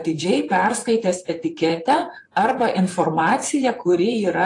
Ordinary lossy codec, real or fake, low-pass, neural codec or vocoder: AAC, 48 kbps; fake; 10.8 kHz; vocoder, 44.1 kHz, 128 mel bands, Pupu-Vocoder